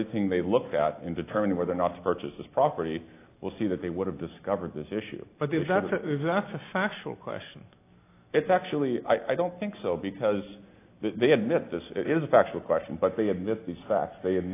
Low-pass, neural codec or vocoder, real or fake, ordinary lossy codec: 3.6 kHz; none; real; AAC, 24 kbps